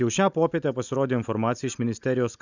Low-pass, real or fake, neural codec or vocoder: 7.2 kHz; real; none